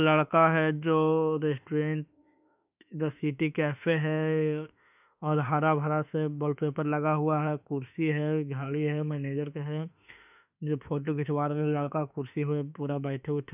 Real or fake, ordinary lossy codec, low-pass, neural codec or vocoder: fake; none; 3.6 kHz; autoencoder, 48 kHz, 32 numbers a frame, DAC-VAE, trained on Japanese speech